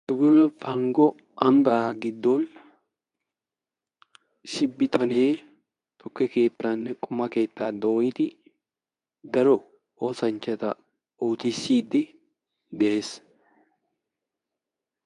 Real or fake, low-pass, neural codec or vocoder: fake; 10.8 kHz; codec, 24 kHz, 0.9 kbps, WavTokenizer, medium speech release version 2